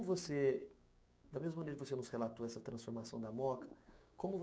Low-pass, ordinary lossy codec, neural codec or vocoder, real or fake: none; none; codec, 16 kHz, 6 kbps, DAC; fake